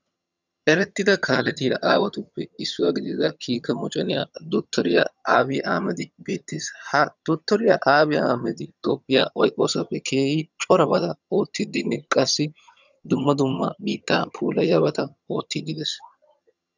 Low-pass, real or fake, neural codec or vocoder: 7.2 kHz; fake; vocoder, 22.05 kHz, 80 mel bands, HiFi-GAN